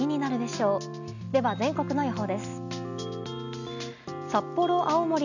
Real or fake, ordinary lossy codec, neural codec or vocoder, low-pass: real; none; none; 7.2 kHz